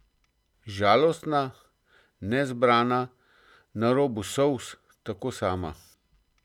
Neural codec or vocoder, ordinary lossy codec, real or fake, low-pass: none; none; real; 19.8 kHz